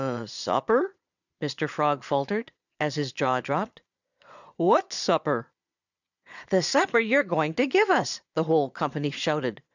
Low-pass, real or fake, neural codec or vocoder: 7.2 kHz; fake; vocoder, 22.05 kHz, 80 mel bands, Vocos